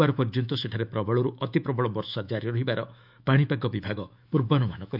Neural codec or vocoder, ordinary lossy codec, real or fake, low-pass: autoencoder, 48 kHz, 128 numbers a frame, DAC-VAE, trained on Japanese speech; none; fake; 5.4 kHz